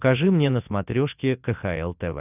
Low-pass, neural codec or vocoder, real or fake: 3.6 kHz; none; real